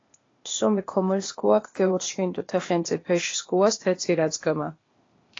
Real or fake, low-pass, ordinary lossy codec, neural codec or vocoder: fake; 7.2 kHz; AAC, 32 kbps; codec, 16 kHz, 0.8 kbps, ZipCodec